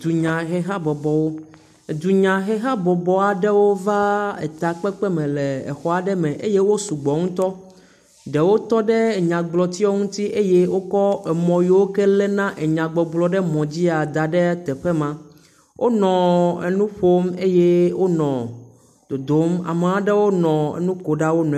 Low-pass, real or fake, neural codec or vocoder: 14.4 kHz; real; none